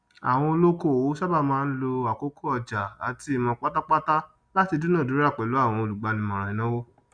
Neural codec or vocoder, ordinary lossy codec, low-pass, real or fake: none; Opus, 64 kbps; 9.9 kHz; real